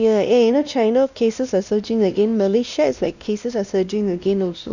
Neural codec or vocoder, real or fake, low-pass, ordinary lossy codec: codec, 16 kHz, 1 kbps, X-Codec, WavLM features, trained on Multilingual LibriSpeech; fake; 7.2 kHz; none